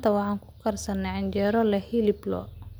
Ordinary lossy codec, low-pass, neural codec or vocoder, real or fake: none; none; none; real